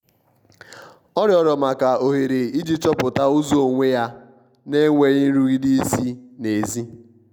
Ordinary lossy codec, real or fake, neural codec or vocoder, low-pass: none; real; none; 19.8 kHz